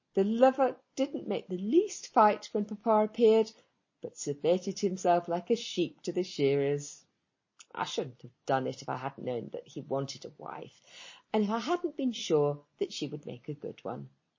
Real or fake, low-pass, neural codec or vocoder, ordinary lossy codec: real; 7.2 kHz; none; MP3, 32 kbps